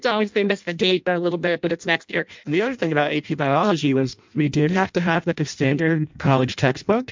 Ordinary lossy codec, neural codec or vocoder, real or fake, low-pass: MP3, 64 kbps; codec, 16 kHz in and 24 kHz out, 0.6 kbps, FireRedTTS-2 codec; fake; 7.2 kHz